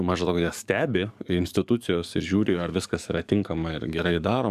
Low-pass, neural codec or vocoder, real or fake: 14.4 kHz; codec, 44.1 kHz, 7.8 kbps, DAC; fake